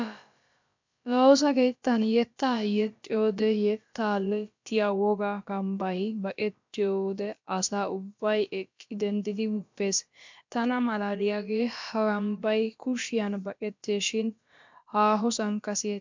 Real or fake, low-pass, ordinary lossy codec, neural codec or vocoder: fake; 7.2 kHz; MP3, 64 kbps; codec, 16 kHz, about 1 kbps, DyCAST, with the encoder's durations